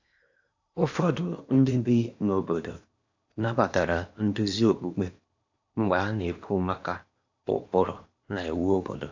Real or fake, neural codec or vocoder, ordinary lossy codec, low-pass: fake; codec, 16 kHz in and 24 kHz out, 0.8 kbps, FocalCodec, streaming, 65536 codes; AAC, 48 kbps; 7.2 kHz